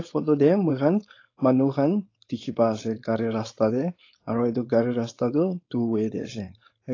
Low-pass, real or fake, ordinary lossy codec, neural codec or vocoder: 7.2 kHz; fake; AAC, 32 kbps; codec, 16 kHz, 4.8 kbps, FACodec